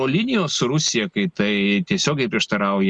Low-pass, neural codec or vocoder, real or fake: 10.8 kHz; none; real